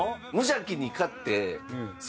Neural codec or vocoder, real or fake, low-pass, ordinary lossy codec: none; real; none; none